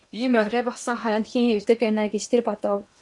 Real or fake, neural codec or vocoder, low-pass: fake; codec, 16 kHz in and 24 kHz out, 0.8 kbps, FocalCodec, streaming, 65536 codes; 10.8 kHz